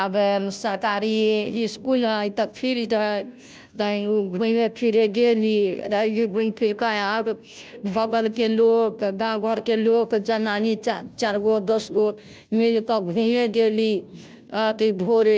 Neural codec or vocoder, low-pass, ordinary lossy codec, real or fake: codec, 16 kHz, 0.5 kbps, FunCodec, trained on Chinese and English, 25 frames a second; none; none; fake